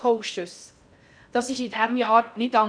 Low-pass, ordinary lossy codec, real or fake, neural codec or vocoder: 9.9 kHz; none; fake; codec, 16 kHz in and 24 kHz out, 0.6 kbps, FocalCodec, streaming, 4096 codes